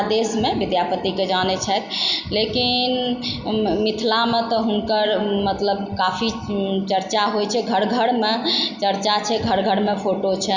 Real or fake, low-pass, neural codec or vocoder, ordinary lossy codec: real; 7.2 kHz; none; Opus, 64 kbps